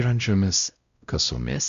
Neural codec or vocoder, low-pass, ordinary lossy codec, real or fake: codec, 16 kHz, 0.5 kbps, X-Codec, WavLM features, trained on Multilingual LibriSpeech; 7.2 kHz; Opus, 64 kbps; fake